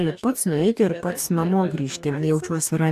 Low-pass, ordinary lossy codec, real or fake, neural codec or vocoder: 14.4 kHz; AAC, 96 kbps; fake; codec, 44.1 kHz, 2.6 kbps, DAC